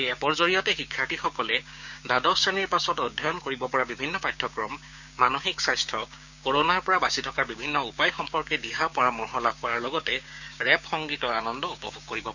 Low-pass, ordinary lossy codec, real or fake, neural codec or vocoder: 7.2 kHz; none; fake; codec, 16 kHz, 6 kbps, DAC